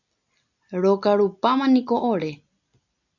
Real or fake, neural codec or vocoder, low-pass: real; none; 7.2 kHz